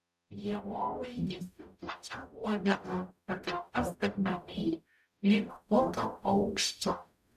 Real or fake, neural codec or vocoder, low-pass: fake; codec, 44.1 kHz, 0.9 kbps, DAC; 14.4 kHz